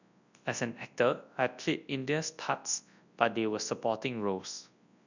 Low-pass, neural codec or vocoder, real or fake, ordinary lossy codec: 7.2 kHz; codec, 24 kHz, 0.9 kbps, WavTokenizer, large speech release; fake; none